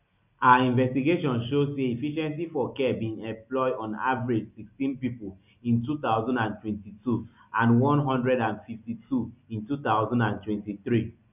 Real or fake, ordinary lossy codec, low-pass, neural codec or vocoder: real; none; 3.6 kHz; none